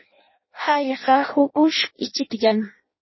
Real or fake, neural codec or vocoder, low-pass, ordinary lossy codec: fake; codec, 16 kHz in and 24 kHz out, 0.6 kbps, FireRedTTS-2 codec; 7.2 kHz; MP3, 24 kbps